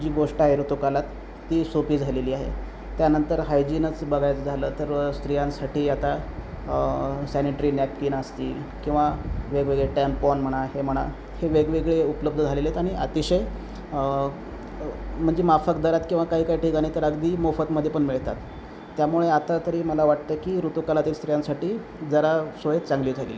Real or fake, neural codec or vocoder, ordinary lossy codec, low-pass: real; none; none; none